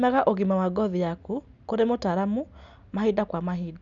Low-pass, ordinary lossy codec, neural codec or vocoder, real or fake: 7.2 kHz; none; none; real